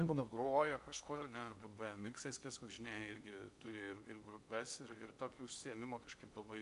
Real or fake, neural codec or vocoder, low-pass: fake; codec, 16 kHz in and 24 kHz out, 0.8 kbps, FocalCodec, streaming, 65536 codes; 10.8 kHz